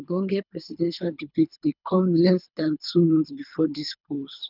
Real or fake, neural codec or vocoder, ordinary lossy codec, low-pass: fake; codec, 24 kHz, 3 kbps, HILCodec; none; 5.4 kHz